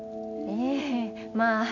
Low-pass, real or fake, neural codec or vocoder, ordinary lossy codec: 7.2 kHz; real; none; none